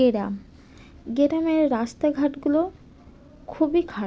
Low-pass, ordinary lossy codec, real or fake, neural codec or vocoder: none; none; real; none